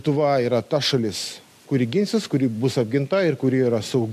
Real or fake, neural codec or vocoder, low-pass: real; none; 14.4 kHz